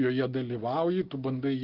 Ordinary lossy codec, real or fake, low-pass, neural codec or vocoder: Opus, 16 kbps; real; 5.4 kHz; none